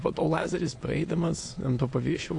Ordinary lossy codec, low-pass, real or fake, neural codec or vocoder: AAC, 48 kbps; 9.9 kHz; fake; autoencoder, 22.05 kHz, a latent of 192 numbers a frame, VITS, trained on many speakers